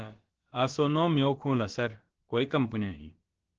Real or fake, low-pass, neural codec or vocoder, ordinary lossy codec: fake; 7.2 kHz; codec, 16 kHz, about 1 kbps, DyCAST, with the encoder's durations; Opus, 16 kbps